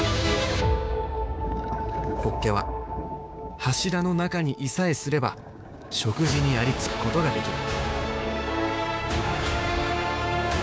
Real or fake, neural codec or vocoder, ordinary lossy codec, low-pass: fake; codec, 16 kHz, 6 kbps, DAC; none; none